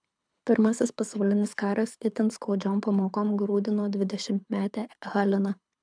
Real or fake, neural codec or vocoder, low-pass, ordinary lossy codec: fake; codec, 24 kHz, 6 kbps, HILCodec; 9.9 kHz; MP3, 96 kbps